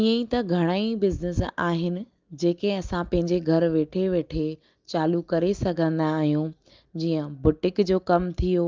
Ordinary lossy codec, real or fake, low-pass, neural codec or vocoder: Opus, 24 kbps; real; 7.2 kHz; none